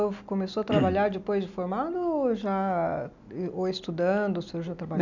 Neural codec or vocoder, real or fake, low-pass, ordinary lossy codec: none; real; 7.2 kHz; none